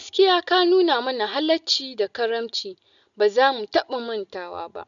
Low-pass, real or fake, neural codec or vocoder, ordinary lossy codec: 7.2 kHz; real; none; none